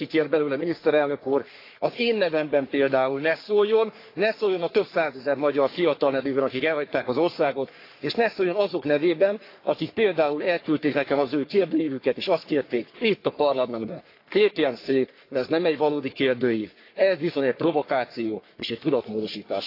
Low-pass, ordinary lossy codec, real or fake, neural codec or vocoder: 5.4 kHz; AAC, 32 kbps; fake; codec, 44.1 kHz, 3.4 kbps, Pupu-Codec